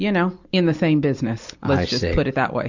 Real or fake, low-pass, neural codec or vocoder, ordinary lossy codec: real; 7.2 kHz; none; Opus, 64 kbps